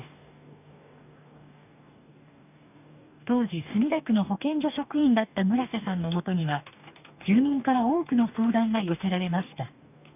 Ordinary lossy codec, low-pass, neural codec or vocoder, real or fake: none; 3.6 kHz; codec, 44.1 kHz, 2.6 kbps, DAC; fake